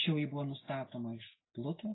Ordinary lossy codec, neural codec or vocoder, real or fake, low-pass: AAC, 16 kbps; none; real; 7.2 kHz